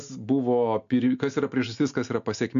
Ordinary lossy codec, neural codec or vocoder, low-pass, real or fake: AAC, 96 kbps; none; 7.2 kHz; real